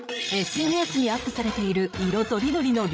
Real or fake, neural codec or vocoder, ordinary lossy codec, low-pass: fake; codec, 16 kHz, 8 kbps, FreqCodec, larger model; none; none